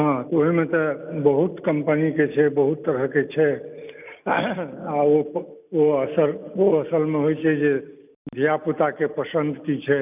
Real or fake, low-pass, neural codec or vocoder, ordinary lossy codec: real; 3.6 kHz; none; none